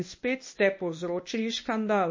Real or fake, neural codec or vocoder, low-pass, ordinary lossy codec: fake; codec, 24 kHz, 0.9 kbps, WavTokenizer, medium speech release version 1; 7.2 kHz; MP3, 32 kbps